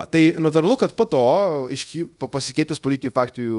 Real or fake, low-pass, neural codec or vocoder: fake; 10.8 kHz; codec, 24 kHz, 0.5 kbps, DualCodec